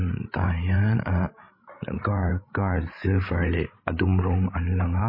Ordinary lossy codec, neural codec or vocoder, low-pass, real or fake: MP3, 24 kbps; codec, 16 kHz, 16 kbps, FreqCodec, larger model; 5.4 kHz; fake